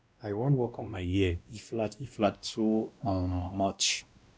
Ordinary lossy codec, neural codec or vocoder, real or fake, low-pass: none; codec, 16 kHz, 1 kbps, X-Codec, WavLM features, trained on Multilingual LibriSpeech; fake; none